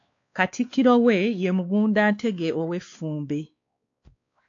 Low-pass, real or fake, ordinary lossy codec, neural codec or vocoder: 7.2 kHz; fake; AAC, 48 kbps; codec, 16 kHz, 2 kbps, X-Codec, WavLM features, trained on Multilingual LibriSpeech